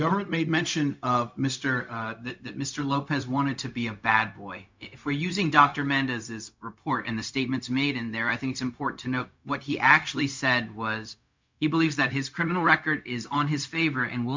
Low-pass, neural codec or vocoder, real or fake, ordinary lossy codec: 7.2 kHz; codec, 16 kHz, 0.4 kbps, LongCat-Audio-Codec; fake; MP3, 64 kbps